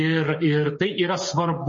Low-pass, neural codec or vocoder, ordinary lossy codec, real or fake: 7.2 kHz; codec, 16 kHz, 8 kbps, FreqCodec, smaller model; MP3, 32 kbps; fake